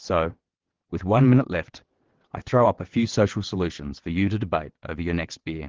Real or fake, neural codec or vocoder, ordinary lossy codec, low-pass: fake; vocoder, 22.05 kHz, 80 mel bands, WaveNeXt; Opus, 16 kbps; 7.2 kHz